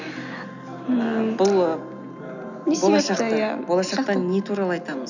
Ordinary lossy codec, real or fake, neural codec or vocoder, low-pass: none; real; none; 7.2 kHz